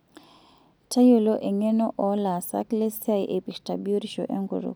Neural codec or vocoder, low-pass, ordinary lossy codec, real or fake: none; none; none; real